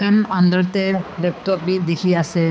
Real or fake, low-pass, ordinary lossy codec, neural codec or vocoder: fake; none; none; codec, 16 kHz, 4 kbps, X-Codec, HuBERT features, trained on balanced general audio